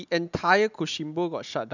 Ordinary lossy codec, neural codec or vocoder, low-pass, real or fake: none; none; 7.2 kHz; real